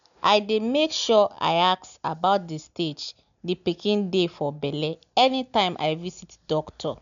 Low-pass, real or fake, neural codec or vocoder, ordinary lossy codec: 7.2 kHz; real; none; none